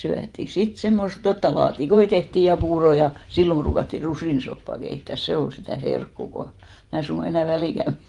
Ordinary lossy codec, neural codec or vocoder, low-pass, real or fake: Opus, 24 kbps; vocoder, 24 kHz, 100 mel bands, Vocos; 10.8 kHz; fake